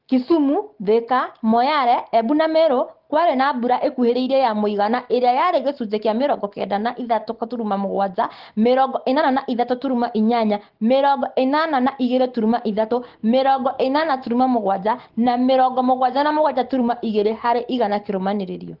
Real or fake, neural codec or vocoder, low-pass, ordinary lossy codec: real; none; 5.4 kHz; Opus, 16 kbps